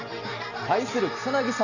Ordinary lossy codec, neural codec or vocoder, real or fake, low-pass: none; none; real; 7.2 kHz